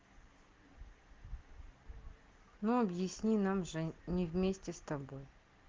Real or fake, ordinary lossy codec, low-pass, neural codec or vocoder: real; Opus, 16 kbps; 7.2 kHz; none